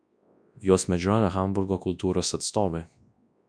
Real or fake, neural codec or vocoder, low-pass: fake; codec, 24 kHz, 0.9 kbps, WavTokenizer, large speech release; 9.9 kHz